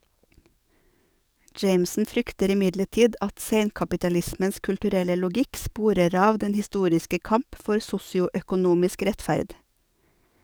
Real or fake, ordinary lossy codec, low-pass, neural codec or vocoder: fake; none; none; codec, 44.1 kHz, 7.8 kbps, DAC